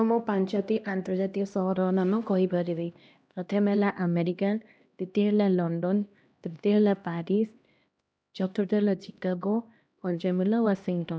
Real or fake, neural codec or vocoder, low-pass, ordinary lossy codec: fake; codec, 16 kHz, 1 kbps, X-Codec, HuBERT features, trained on LibriSpeech; none; none